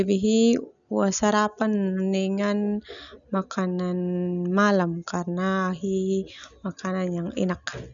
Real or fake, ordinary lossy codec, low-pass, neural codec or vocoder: real; none; 7.2 kHz; none